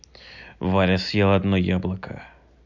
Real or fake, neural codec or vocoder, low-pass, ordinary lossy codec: real; none; 7.2 kHz; none